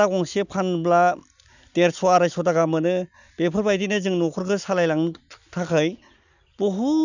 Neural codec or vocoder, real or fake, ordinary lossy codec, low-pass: none; real; none; 7.2 kHz